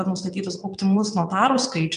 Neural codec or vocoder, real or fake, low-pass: vocoder, 22.05 kHz, 80 mel bands, WaveNeXt; fake; 9.9 kHz